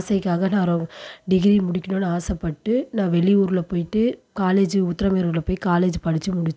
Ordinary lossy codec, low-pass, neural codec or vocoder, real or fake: none; none; none; real